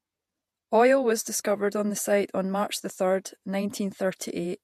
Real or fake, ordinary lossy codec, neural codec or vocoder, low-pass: fake; MP3, 64 kbps; vocoder, 48 kHz, 128 mel bands, Vocos; 14.4 kHz